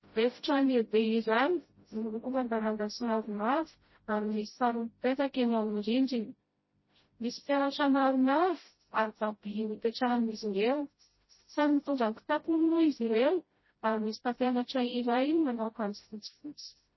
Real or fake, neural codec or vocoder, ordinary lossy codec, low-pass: fake; codec, 16 kHz, 0.5 kbps, FreqCodec, smaller model; MP3, 24 kbps; 7.2 kHz